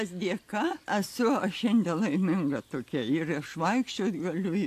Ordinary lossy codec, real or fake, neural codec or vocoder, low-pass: AAC, 64 kbps; real; none; 14.4 kHz